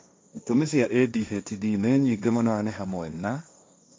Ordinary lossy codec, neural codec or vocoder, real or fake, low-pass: none; codec, 16 kHz, 1.1 kbps, Voila-Tokenizer; fake; none